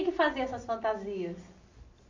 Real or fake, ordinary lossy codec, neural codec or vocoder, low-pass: real; AAC, 48 kbps; none; 7.2 kHz